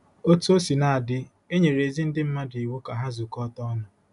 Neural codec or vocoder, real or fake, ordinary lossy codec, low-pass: none; real; none; 10.8 kHz